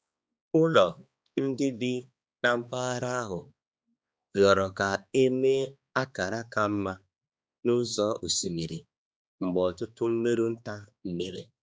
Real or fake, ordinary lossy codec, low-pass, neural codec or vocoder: fake; none; none; codec, 16 kHz, 2 kbps, X-Codec, HuBERT features, trained on balanced general audio